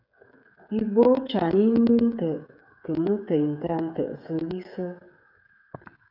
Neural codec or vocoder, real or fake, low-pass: codec, 16 kHz, 8 kbps, FreqCodec, smaller model; fake; 5.4 kHz